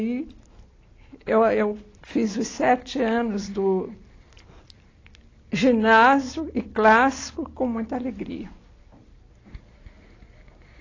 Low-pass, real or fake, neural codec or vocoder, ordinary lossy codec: 7.2 kHz; real; none; AAC, 32 kbps